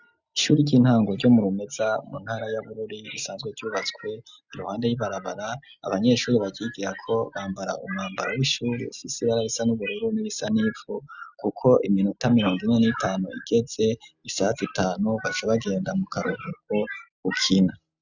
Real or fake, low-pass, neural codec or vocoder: real; 7.2 kHz; none